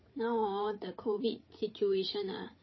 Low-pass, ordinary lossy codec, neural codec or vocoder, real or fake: 7.2 kHz; MP3, 24 kbps; codec, 16 kHz, 8 kbps, FreqCodec, larger model; fake